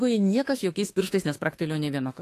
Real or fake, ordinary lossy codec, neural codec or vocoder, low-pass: fake; AAC, 48 kbps; autoencoder, 48 kHz, 32 numbers a frame, DAC-VAE, trained on Japanese speech; 14.4 kHz